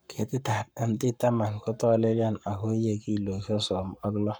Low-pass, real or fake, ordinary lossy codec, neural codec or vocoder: none; fake; none; codec, 44.1 kHz, 7.8 kbps, Pupu-Codec